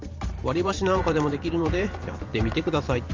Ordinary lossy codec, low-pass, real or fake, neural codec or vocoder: Opus, 32 kbps; 7.2 kHz; fake; vocoder, 44.1 kHz, 128 mel bands every 512 samples, BigVGAN v2